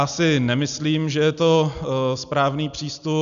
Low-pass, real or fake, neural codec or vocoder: 7.2 kHz; real; none